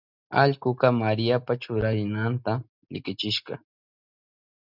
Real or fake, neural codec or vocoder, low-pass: real; none; 5.4 kHz